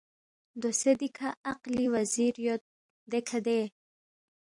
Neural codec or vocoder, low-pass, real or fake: vocoder, 44.1 kHz, 128 mel bands every 256 samples, BigVGAN v2; 10.8 kHz; fake